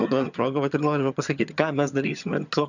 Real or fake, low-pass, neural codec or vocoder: fake; 7.2 kHz; vocoder, 22.05 kHz, 80 mel bands, HiFi-GAN